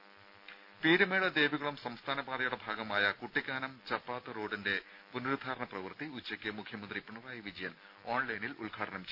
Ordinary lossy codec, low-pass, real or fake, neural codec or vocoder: none; 5.4 kHz; real; none